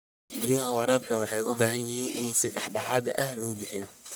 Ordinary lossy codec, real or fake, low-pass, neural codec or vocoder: none; fake; none; codec, 44.1 kHz, 1.7 kbps, Pupu-Codec